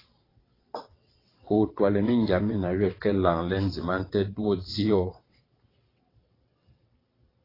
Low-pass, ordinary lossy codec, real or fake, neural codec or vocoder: 5.4 kHz; AAC, 24 kbps; fake; vocoder, 22.05 kHz, 80 mel bands, WaveNeXt